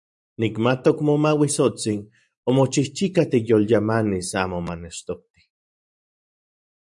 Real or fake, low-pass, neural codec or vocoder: fake; 10.8 kHz; vocoder, 44.1 kHz, 128 mel bands every 512 samples, BigVGAN v2